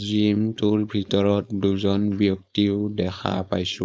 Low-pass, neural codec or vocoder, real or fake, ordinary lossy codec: none; codec, 16 kHz, 4.8 kbps, FACodec; fake; none